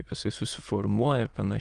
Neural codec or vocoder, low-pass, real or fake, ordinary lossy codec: autoencoder, 22.05 kHz, a latent of 192 numbers a frame, VITS, trained on many speakers; 9.9 kHz; fake; Opus, 24 kbps